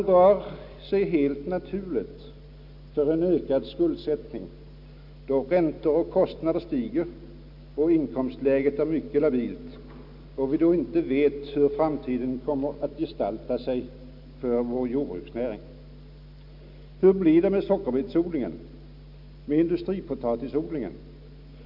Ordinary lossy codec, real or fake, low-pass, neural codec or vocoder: none; real; 5.4 kHz; none